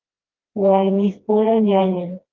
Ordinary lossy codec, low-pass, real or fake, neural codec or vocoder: Opus, 32 kbps; 7.2 kHz; fake; codec, 16 kHz, 1 kbps, FreqCodec, smaller model